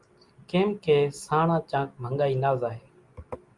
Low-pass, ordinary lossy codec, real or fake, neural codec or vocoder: 10.8 kHz; Opus, 32 kbps; real; none